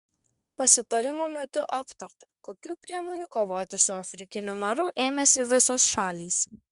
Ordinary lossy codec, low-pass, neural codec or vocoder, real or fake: Opus, 64 kbps; 10.8 kHz; codec, 24 kHz, 1 kbps, SNAC; fake